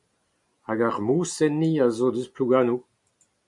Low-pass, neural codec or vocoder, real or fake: 10.8 kHz; none; real